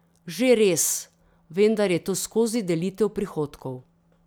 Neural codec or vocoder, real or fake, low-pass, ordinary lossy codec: none; real; none; none